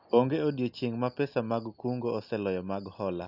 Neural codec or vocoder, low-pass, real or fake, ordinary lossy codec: none; 5.4 kHz; real; none